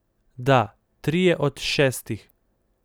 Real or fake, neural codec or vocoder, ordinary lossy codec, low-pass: real; none; none; none